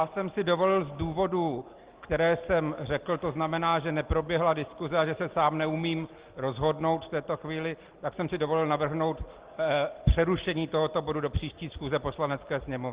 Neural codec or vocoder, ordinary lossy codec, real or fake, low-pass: none; Opus, 16 kbps; real; 3.6 kHz